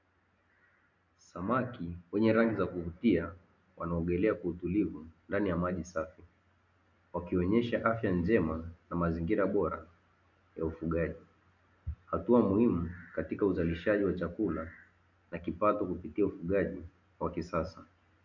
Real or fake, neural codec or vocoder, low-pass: real; none; 7.2 kHz